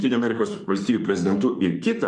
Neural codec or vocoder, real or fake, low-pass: autoencoder, 48 kHz, 32 numbers a frame, DAC-VAE, trained on Japanese speech; fake; 10.8 kHz